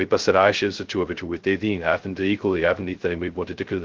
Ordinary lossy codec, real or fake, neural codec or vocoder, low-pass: Opus, 16 kbps; fake; codec, 16 kHz, 0.2 kbps, FocalCodec; 7.2 kHz